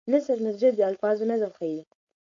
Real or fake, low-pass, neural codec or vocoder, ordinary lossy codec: fake; 7.2 kHz; codec, 16 kHz, 4.8 kbps, FACodec; AAC, 48 kbps